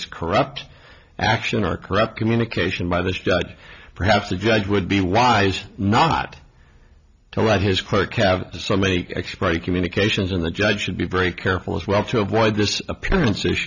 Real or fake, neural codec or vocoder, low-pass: real; none; 7.2 kHz